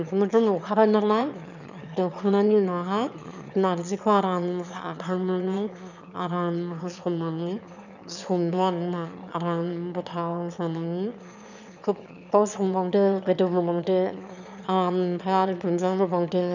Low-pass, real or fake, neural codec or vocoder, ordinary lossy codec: 7.2 kHz; fake; autoencoder, 22.05 kHz, a latent of 192 numbers a frame, VITS, trained on one speaker; none